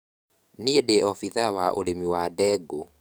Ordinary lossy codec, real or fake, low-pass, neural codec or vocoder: none; fake; none; codec, 44.1 kHz, 7.8 kbps, DAC